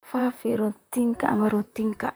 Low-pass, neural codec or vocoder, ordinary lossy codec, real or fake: none; vocoder, 44.1 kHz, 128 mel bands, Pupu-Vocoder; none; fake